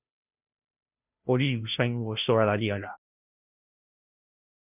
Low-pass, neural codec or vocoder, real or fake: 3.6 kHz; codec, 16 kHz, 0.5 kbps, FunCodec, trained on Chinese and English, 25 frames a second; fake